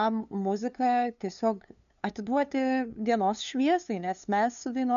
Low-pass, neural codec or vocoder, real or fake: 7.2 kHz; codec, 16 kHz, 2 kbps, FunCodec, trained on LibriTTS, 25 frames a second; fake